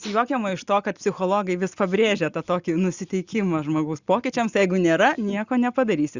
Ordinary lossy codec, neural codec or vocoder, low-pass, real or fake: Opus, 64 kbps; none; 7.2 kHz; real